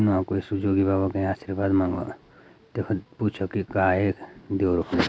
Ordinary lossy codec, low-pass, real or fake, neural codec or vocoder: none; none; real; none